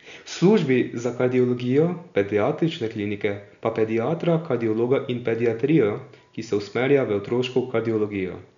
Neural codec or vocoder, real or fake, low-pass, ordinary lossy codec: none; real; 7.2 kHz; none